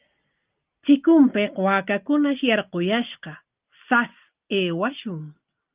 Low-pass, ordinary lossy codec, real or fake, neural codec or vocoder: 3.6 kHz; Opus, 24 kbps; real; none